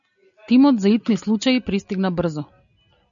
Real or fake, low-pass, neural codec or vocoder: real; 7.2 kHz; none